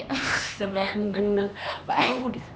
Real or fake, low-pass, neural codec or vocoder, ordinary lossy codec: fake; none; codec, 16 kHz, 2 kbps, X-Codec, HuBERT features, trained on LibriSpeech; none